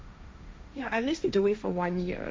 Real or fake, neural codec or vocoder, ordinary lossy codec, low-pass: fake; codec, 16 kHz, 1.1 kbps, Voila-Tokenizer; none; none